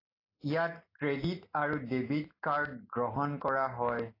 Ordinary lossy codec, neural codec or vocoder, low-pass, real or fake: AAC, 24 kbps; none; 5.4 kHz; real